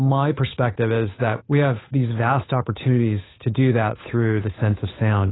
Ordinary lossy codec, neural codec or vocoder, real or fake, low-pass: AAC, 16 kbps; codec, 16 kHz, 8 kbps, FunCodec, trained on Chinese and English, 25 frames a second; fake; 7.2 kHz